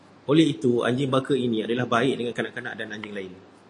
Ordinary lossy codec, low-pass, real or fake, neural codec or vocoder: AAC, 48 kbps; 10.8 kHz; real; none